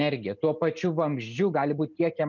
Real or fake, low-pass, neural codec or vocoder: real; 7.2 kHz; none